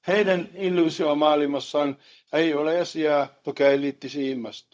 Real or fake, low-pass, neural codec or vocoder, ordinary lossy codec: fake; none; codec, 16 kHz, 0.4 kbps, LongCat-Audio-Codec; none